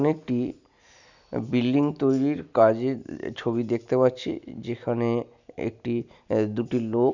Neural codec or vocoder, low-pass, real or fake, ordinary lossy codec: none; 7.2 kHz; real; none